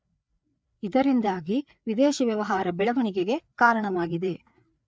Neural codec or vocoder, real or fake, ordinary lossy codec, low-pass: codec, 16 kHz, 4 kbps, FreqCodec, larger model; fake; none; none